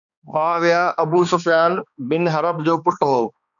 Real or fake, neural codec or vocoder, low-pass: fake; codec, 16 kHz, 2 kbps, X-Codec, HuBERT features, trained on balanced general audio; 7.2 kHz